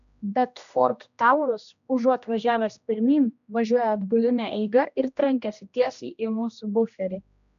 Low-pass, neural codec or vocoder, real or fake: 7.2 kHz; codec, 16 kHz, 1 kbps, X-Codec, HuBERT features, trained on general audio; fake